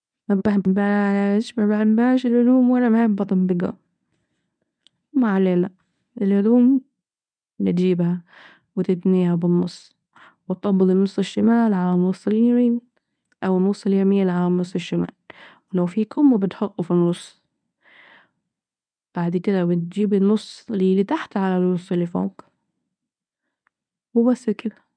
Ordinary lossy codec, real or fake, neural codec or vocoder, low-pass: none; fake; codec, 24 kHz, 0.9 kbps, WavTokenizer, medium speech release version 1; 9.9 kHz